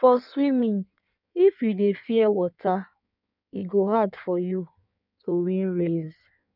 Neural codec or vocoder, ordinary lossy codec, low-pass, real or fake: codec, 16 kHz in and 24 kHz out, 1.1 kbps, FireRedTTS-2 codec; none; 5.4 kHz; fake